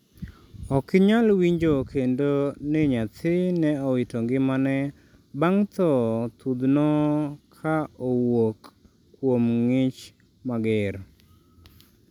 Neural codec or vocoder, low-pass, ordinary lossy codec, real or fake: none; 19.8 kHz; none; real